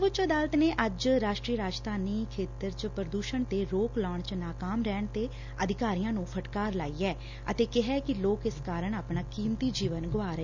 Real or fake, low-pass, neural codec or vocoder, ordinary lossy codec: real; 7.2 kHz; none; none